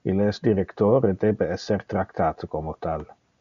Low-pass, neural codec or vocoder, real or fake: 7.2 kHz; none; real